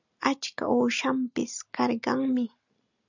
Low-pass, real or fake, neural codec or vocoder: 7.2 kHz; real; none